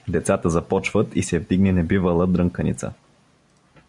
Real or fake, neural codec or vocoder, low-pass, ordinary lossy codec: real; none; 10.8 kHz; MP3, 96 kbps